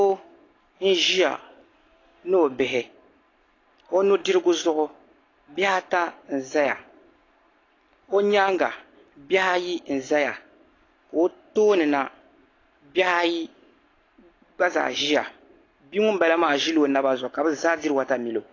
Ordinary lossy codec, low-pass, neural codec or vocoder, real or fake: AAC, 32 kbps; 7.2 kHz; none; real